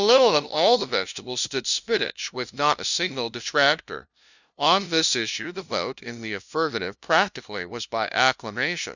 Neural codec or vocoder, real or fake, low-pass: codec, 16 kHz, 0.5 kbps, FunCodec, trained on LibriTTS, 25 frames a second; fake; 7.2 kHz